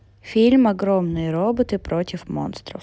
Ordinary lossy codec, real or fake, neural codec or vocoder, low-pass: none; real; none; none